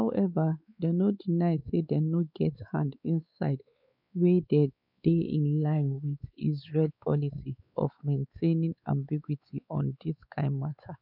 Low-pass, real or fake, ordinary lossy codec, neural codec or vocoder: 5.4 kHz; fake; none; codec, 16 kHz, 4 kbps, X-Codec, WavLM features, trained on Multilingual LibriSpeech